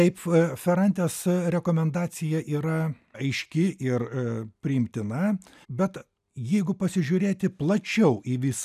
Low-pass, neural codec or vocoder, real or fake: 14.4 kHz; none; real